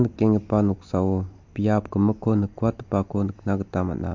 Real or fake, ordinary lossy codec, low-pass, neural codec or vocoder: real; MP3, 48 kbps; 7.2 kHz; none